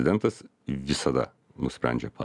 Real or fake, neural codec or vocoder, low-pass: real; none; 10.8 kHz